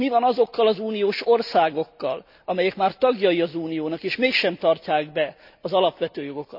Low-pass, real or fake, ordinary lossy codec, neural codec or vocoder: 5.4 kHz; real; none; none